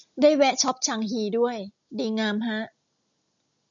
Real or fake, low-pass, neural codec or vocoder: real; 7.2 kHz; none